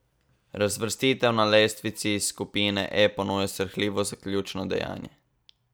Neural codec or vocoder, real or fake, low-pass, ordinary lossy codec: vocoder, 44.1 kHz, 128 mel bands every 256 samples, BigVGAN v2; fake; none; none